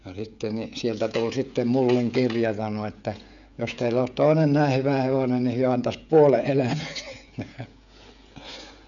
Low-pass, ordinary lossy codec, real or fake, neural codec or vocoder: 7.2 kHz; none; fake; codec, 16 kHz, 16 kbps, FreqCodec, smaller model